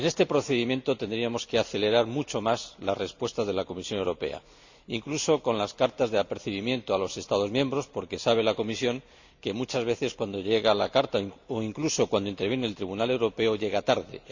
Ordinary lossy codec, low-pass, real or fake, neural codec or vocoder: Opus, 64 kbps; 7.2 kHz; real; none